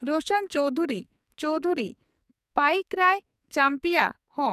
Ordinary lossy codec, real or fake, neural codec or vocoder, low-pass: none; fake; codec, 44.1 kHz, 2.6 kbps, SNAC; 14.4 kHz